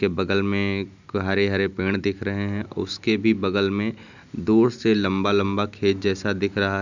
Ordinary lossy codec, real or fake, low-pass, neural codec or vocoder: none; real; 7.2 kHz; none